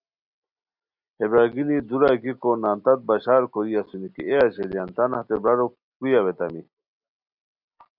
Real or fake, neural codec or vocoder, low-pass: real; none; 5.4 kHz